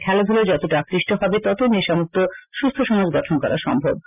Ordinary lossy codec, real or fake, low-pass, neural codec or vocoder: none; real; 3.6 kHz; none